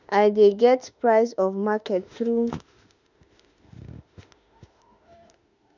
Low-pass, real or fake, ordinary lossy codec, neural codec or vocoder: 7.2 kHz; fake; none; autoencoder, 48 kHz, 32 numbers a frame, DAC-VAE, trained on Japanese speech